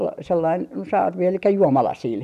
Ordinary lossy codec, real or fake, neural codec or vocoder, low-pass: none; real; none; 14.4 kHz